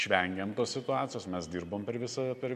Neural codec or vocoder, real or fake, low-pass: none; real; 14.4 kHz